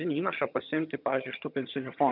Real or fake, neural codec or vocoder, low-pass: fake; vocoder, 22.05 kHz, 80 mel bands, HiFi-GAN; 5.4 kHz